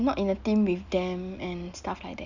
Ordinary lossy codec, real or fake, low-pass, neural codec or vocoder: none; real; 7.2 kHz; none